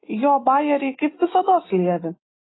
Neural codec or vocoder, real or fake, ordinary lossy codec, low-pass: none; real; AAC, 16 kbps; 7.2 kHz